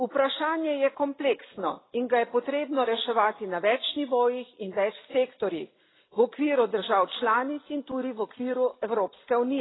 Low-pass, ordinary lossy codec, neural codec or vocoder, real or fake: 7.2 kHz; AAC, 16 kbps; none; real